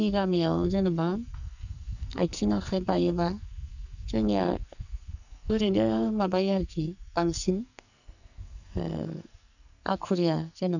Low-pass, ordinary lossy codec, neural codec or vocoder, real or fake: 7.2 kHz; none; codec, 44.1 kHz, 2.6 kbps, SNAC; fake